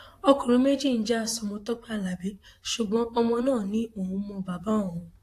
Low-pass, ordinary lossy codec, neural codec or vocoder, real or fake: 14.4 kHz; AAC, 64 kbps; vocoder, 44.1 kHz, 128 mel bands, Pupu-Vocoder; fake